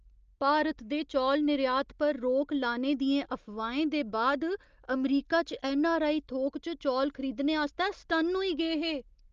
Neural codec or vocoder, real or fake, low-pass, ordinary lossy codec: none; real; 7.2 kHz; Opus, 24 kbps